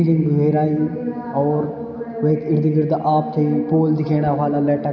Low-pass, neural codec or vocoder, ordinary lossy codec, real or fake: 7.2 kHz; none; none; real